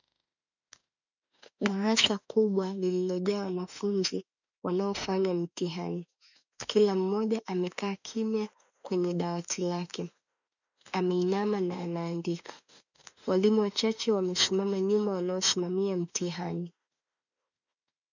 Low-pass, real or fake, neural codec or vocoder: 7.2 kHz; fake; autoencoder, 48 kHz, 32 numbers a frame, DAC-VAE, trained on Japanese speech